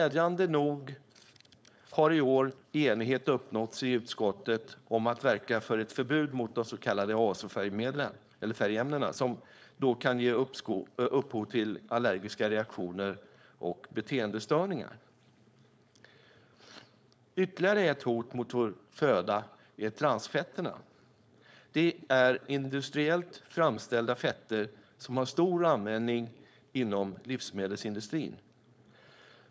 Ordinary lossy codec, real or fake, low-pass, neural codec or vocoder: none; fake; none; codec, 16 kHz, 4.8 kbps, FACodec